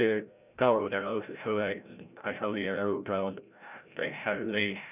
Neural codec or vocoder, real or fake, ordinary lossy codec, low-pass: codec, 16 kHz, 0.5 kbps, FreqCodec, larger model; fake; none; 3.6 kHz